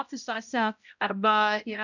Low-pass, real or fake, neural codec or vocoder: 7.2 kHz; fake; codec, 16 kHz, 0.5 kbps, X-Codec, HuBERT features, trained on balanced general audio